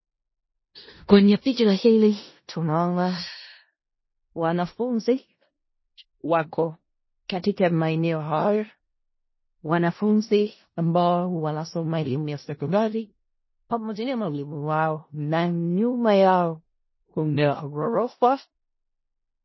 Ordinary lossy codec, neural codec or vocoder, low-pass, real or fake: MP3, 24 kbps; codec, 16 kHz in and 24 kHz out, 0.4 kbps, LongCat-Audio-Codec, four codebook decoder; 7.2 kHz; fake